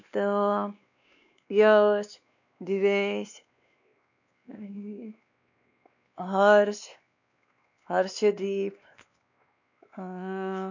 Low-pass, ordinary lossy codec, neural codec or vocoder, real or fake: 7.2 kHz; none; codec, 16 kHz, 2 kbps, X-Codec, WavLM features, trained on Multilingual LibriSpeech; fake